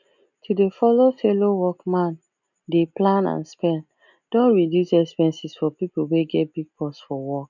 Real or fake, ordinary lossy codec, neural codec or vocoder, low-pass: real; none; none; 7.2 kHz